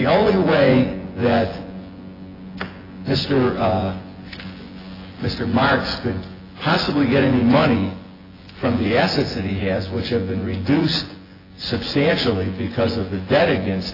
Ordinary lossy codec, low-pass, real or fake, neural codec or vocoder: AAC, 24 kbps; 5.4 kHz; fake; vocoder, 24 kHz, 100 mel bands, Vocos